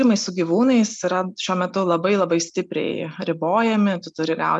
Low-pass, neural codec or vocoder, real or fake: 9.9 kHz; none; real